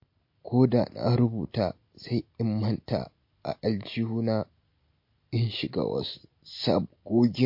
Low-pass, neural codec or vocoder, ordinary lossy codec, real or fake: 5.4 kHz; none; MP3, 32 kbps; real